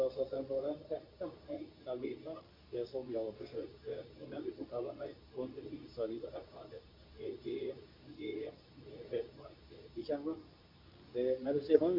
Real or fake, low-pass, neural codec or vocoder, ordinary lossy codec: fake; 5.4 kHz; codec, 24 kHz, 0.9 kbps, WavTokenizer, medium speech release version 2; AAC, 48 kbps